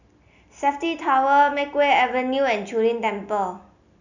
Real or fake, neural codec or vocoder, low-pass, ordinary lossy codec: real; none; 7.2 kHz; none